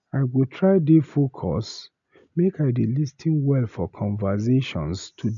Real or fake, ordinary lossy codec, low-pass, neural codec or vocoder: real; none; 7.2 kHz; none